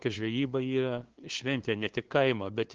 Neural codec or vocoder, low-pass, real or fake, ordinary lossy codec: codec, 16 kHz, 2 kbps, FunCodec, trained on LibriTTS, 25 frames a second; 7.2 kHz; fake; Opus, 16 kbps